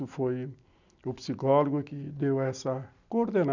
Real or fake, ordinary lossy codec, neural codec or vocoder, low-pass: real; none; none; 7.2 kHz